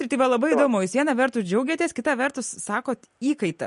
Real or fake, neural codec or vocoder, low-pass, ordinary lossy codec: real; none; 10.8 kHz; MP3, 48 kbps